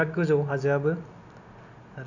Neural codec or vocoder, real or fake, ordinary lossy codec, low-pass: none; real; none; 7.2 kHz